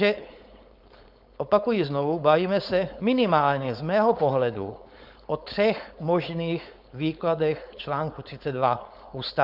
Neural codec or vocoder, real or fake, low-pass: codec, 16 kHz, 4.8 kbps, FACodec; fake; 5.4 kHz